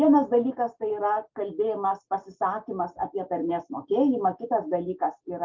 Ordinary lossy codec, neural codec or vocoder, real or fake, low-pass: Opus, 24 kbps; none; real; 7.2 kHz